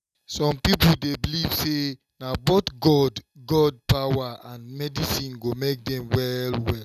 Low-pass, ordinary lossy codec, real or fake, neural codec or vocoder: 14.4 kHz; none; real; none